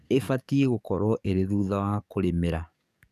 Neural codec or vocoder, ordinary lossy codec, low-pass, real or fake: codec, 44.1 kHz, 7.8 kbps, DAC; none; 14.4 kHz; fake